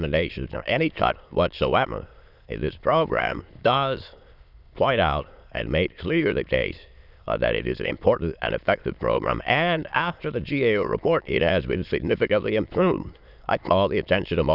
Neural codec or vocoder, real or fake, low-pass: autoencoder, 22.05 kHz, a latent of 192 numbers a frame, VITS, trained on many speakers; fake; 5.4 kHz